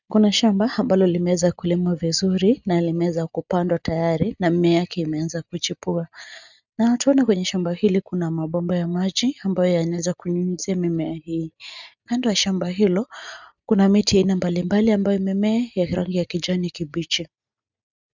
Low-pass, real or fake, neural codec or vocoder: 7.2 kHz; real; none